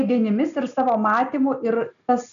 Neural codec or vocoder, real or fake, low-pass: none; real; 7.2 kHz